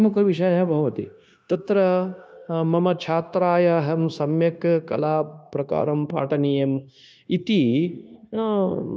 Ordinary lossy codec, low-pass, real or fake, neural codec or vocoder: none; none; fake; codec, 16 kHz, 0.9 kbps, LongCat-Audio-Codec